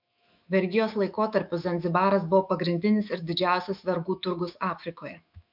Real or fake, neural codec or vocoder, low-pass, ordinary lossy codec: fake; autoencoder, 48 kHz, 128 numbers a frame, DAC-VAE, trained on Japanese speech; 5.4 kHz; MP3, 48 kbps